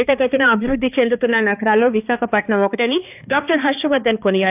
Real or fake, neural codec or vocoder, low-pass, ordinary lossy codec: fake; codec, 16 kHz, 2 kbps, X-Codec, HuBERT features, trained on balanced general audio; 3.6 kHz; AAC, 32 kbps